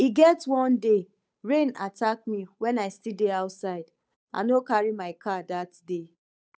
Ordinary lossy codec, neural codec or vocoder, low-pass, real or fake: none; codec, 16 kHz, 8 kbps, FunCodec, trained on Chinese and English, 25 frames a second; none; fake